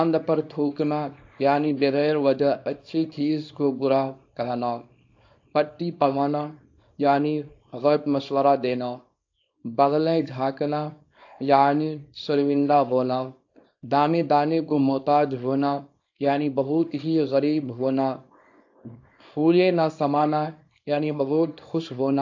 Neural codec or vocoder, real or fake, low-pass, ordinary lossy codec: codec, 24 kHz, 0.9 kbps, WavTokenizer, small release; fake; 7.2 kHz; AAC, 48 kbps